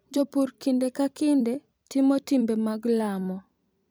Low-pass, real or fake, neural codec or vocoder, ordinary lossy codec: none; fake; vocoder, 44.1 kHz, 128 mel bands every 256 samples, BigVGAN v2; none